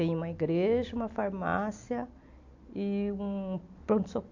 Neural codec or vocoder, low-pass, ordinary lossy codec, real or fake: none; 7.2 kHz; AAC, 48 kbps; real